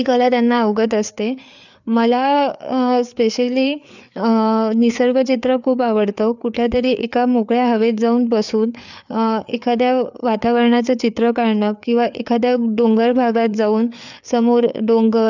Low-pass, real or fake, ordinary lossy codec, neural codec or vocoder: 7.2 kHz; fake; none; codec, 16 kHz, 4 kbps, FreqCodec, larger model